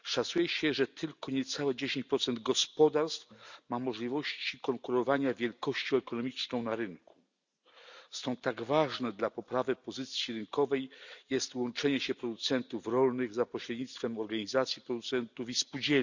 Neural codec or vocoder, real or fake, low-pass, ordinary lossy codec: none; real; 7.2 kHz; none